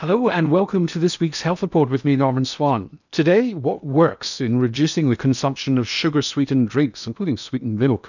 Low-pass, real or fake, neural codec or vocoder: 7.2 kHz; fake; codec, 16 kHz in and 24 kHz out, 0.8 kbps, FocalCodec, streaming, 65536 codes